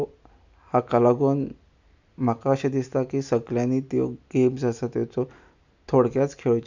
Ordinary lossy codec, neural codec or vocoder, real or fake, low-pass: none; none; real; 7.2 kHz